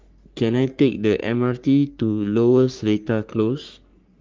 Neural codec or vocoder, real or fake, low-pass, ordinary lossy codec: codec, 44.1 kHz, 3.4 kbps, Pupu-Codec; fake; 7.2 kHz; Opus, 32 kbps